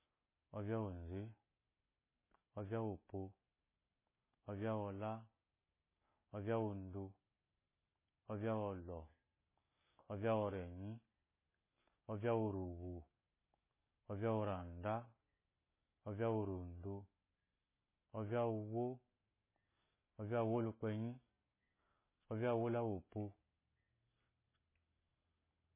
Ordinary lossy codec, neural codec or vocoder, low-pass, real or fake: MP3, 16 kbps; none; 3.6 kHz; real